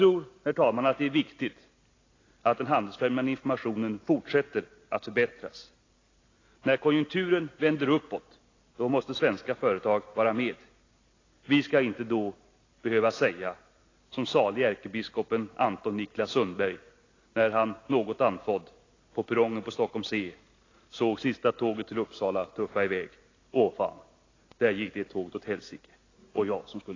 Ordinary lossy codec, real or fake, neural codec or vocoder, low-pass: AAC, 32 kbps; real; none; 7.2 kHz